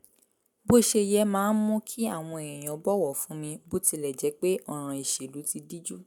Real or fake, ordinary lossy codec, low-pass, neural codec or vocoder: real; none; none; none